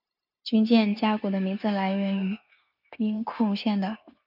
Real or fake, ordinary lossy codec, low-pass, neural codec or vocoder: fake; AAC, 48 kbps; 5.4 kHz; codec, 16 kHz, 0.9 kbps, LongCat-Audio-Codec